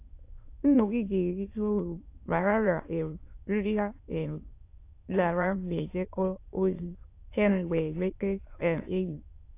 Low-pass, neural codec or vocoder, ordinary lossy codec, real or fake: 3.6 kHz; autoencoder, 22.05 kHz, a latent of 192 numbers a frame, VITS, trained on many speakers; AAC, 24 kbps; fake